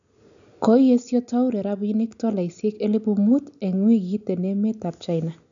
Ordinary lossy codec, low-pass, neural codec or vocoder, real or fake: none; 7.2 kHz; none; real